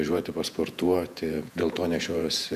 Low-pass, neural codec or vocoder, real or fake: 14.4 kHz; vocoder, 48 kHz, 128 mel bands, Vocos; fake